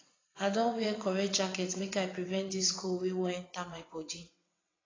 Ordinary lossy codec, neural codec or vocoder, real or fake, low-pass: AAC, 32 kbps; vocoder, 22.05 kHz, 80 mel bands, WaveNeXt; fake; 7.2 kHz